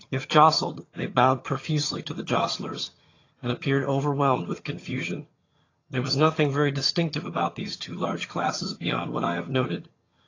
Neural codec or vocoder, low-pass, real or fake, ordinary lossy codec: vocoder, 22.05 kHz, 80 mel bands, HiFi-GAN; 7.2 kHz; fake; AAC, 32 kbps